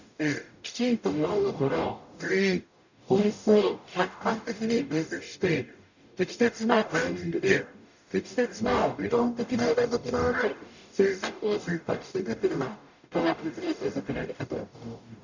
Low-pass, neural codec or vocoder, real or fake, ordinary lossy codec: 7.2 kHz; codec, 44.1 kHz, 0.9 kbps, DAC; fake; none